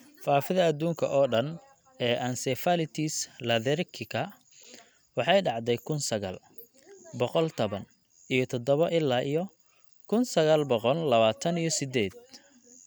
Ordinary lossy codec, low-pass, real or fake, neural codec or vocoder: none; none; real; none